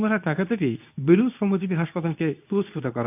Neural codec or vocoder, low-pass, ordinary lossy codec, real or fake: codec, 24 kHz, 0.9 kbps, WavTokenizer, medium speech release version 2; 3.6 kHz; AAC, 32 kbps; fake